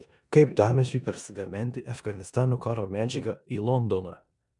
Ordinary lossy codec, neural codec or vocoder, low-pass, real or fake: MP3, 96 kbps; codec, 16 kHz in and 24 kHz out, 0.9 kbps, LongCat-Audio-Codec, four codebook decoder; 10.8 kHz; fake